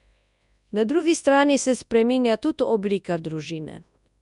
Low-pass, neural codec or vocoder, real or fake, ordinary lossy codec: 10.8 kHz; codec, 24 kHz, 0.9 kbps, WavTokenizer, large speech release; fake; none